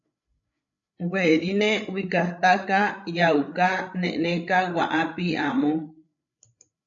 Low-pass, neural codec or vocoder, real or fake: 7.2 kHz; codec, 16 kHz, 8 kbps, FreqCodec, larger model; fake